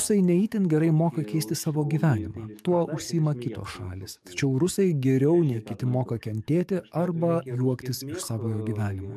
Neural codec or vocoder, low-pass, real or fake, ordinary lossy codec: codec, 44.1 kHz, 7.8 kbps, DAC; 14.4 kHz; fake; MP3, 96 kbps